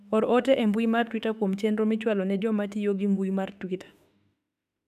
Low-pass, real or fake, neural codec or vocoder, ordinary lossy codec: 14.4 kHz; fake; autoencoder, 48 kHz, 32 numbers a frame, DAC-VAE, trained on Japanese speech; none